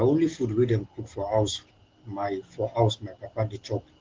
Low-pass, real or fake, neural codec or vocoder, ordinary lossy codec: 7.2 kHz; real; none; Opus, 16 kbps